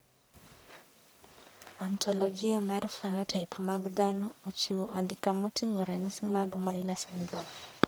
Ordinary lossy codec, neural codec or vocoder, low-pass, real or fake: none; codec, 44.1 kHz, 1.7 kbps, Pupu-Codec; none; fake